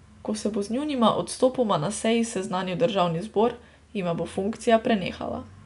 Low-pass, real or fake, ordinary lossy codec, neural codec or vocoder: 10.8 kHz; real; none; none